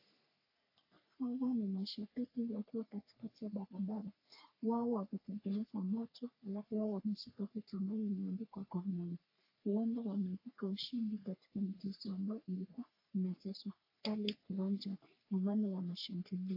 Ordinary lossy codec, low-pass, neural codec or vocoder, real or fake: AAC, 32 kbps; 5.4 kHz; codec, 44.1 kHz, 3.4 kbps, Pupu-Codec; fake